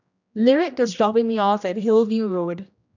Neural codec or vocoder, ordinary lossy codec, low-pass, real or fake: codec, 16 kHz, 1 kbps, X-Codec, HuBERT features, trained on general audio; none; 7.2 kHz; fake